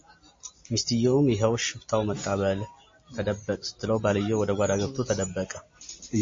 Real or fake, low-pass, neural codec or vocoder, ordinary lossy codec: real; 7.2 kHz; none; MP3, 32 kbps